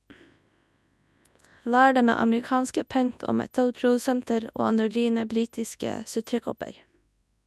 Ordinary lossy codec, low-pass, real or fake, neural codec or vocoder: none; none; fake; codec, 24 kHz, 0.9 kbps, WavTokenizer, large speech release